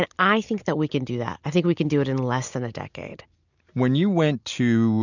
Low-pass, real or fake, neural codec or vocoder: 7.2 kHz; real; none